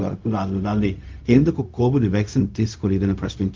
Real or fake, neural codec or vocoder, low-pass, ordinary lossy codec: fake; codec, 16 kHz, 0.4 kbps, LongCat-Audio-Codec; 7.2 kHz; Opus, 24 kbps